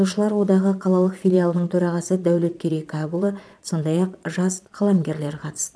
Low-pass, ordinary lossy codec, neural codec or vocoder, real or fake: none; none; vocoder, 22.05 kHz, 80 mel bands, Vocos; fake